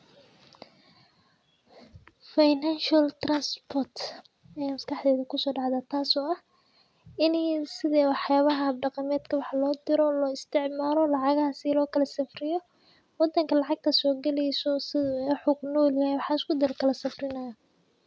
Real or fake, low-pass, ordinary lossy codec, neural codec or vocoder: real; none; none; none